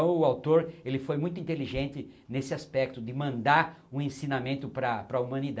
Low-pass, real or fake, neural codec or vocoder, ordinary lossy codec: none; real; none; none